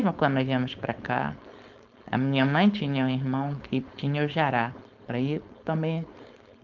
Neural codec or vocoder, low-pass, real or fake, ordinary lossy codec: codec, 16 kHz, 4.8 kbps, FACodec; 7.2 kHz; fake; Opus, 24 kbps